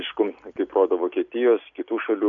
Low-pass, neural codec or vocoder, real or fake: 7.2 kHz; none; real